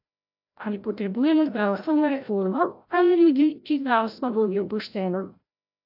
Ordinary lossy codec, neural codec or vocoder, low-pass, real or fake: AAC, 48 kbps; codec, 16 kHz, 0.5 kbps, FreqCodec, larger model; 5.4 kHz; fake